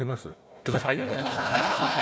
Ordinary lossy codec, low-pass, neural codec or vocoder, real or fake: none; none; codec, 16 kHz, 1 kbps, FunCodec, trained on Chinese and English, 50 frames a second; fake